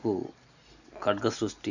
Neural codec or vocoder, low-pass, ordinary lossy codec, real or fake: none; 7.2 kHz; none; real